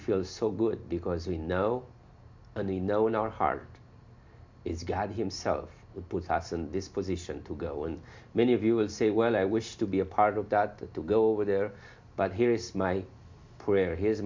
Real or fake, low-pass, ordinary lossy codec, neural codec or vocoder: real; 7.2 kHz; MP3, 64 kbps; none